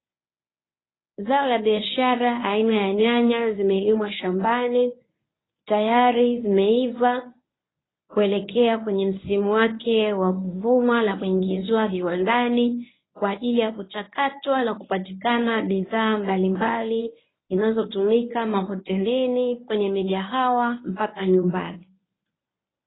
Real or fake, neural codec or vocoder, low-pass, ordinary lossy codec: fake; codec, 24 kHz, 0.9 kbps, WavTokenizer, medium speech release version 1; 7.2 kHz; AAC, 16 kbps